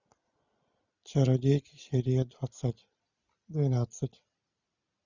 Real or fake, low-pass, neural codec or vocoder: real; 7.2 kHz; none